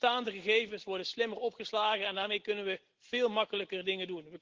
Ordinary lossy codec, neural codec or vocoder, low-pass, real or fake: Opus, 16 kbps; none; 7.2 kHz; real